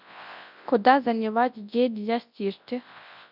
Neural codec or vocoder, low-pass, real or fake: codec, 24 kHz, 0.9 kbps, WavTokenizer, large speech release; 5.4 kHz; fake